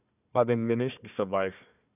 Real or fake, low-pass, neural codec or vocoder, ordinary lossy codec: fake; 3.6 kHz; codec, 16 kHz, 1 kbps, FunCodec, trained on Chinese and English, 50 frames a second; none